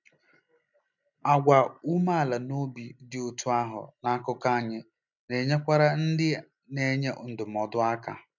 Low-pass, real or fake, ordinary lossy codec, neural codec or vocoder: 7.2 kHz; real; none; none